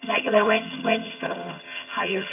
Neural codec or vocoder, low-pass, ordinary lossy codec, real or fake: vocoder, 22.05 kHz, 80 mel bands, HiFi-GAN; 3.6 kHz; none; fake